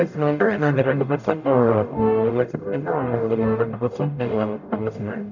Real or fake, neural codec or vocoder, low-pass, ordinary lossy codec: fake; codec, 44.1 kHz, 0.9 kbps, DAC; 7.2 kHz; none